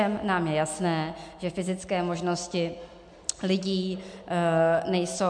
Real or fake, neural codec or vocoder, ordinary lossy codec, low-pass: real; none; MP3, 64 kbps; 9.9 kHz